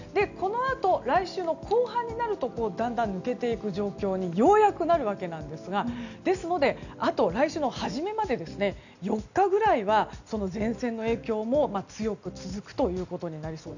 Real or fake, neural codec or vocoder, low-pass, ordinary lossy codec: real; none; 7.2 kHz; none